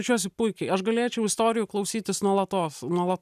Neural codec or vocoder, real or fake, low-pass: none; real; 14.4 kHz